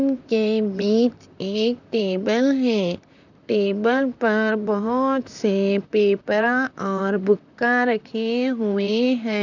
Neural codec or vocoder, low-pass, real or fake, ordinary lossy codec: vocoder, 44.1 kHz, 128 mel bands, Pupu-Vocoder; 7.2 kHz; fake; none